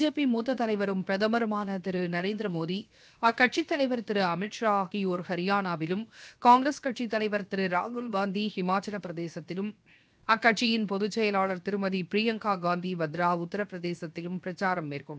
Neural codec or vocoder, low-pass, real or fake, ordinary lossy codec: codec, 16 kHz, 0.7 kbps, FocalCodec; none; fake; none